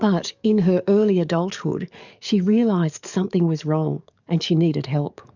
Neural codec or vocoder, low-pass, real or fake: codec, 44.1 kHz, 7.8 kbps, DAC; 7.2 kHz; fake